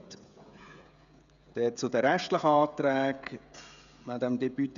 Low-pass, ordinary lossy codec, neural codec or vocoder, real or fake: 7.2 kHz; none; codec, 16 kHz, 16 kbps, FreqCodec, smaller model; fake